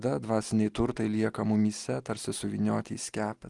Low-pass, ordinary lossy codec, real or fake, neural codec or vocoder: 10.8 kHz; Opus, 24 kbps; real; none